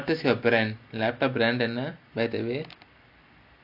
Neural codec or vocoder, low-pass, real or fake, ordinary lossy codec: none; 5.4 kHz; real; none